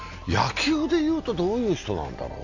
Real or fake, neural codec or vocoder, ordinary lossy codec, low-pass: real; none; AAC, 48 kbps; 7.2 kHz